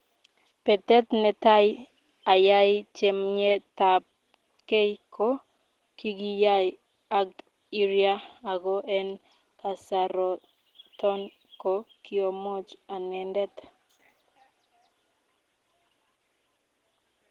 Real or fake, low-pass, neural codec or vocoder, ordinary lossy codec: real; 19.8 kHz; none; Opus, 16 kbps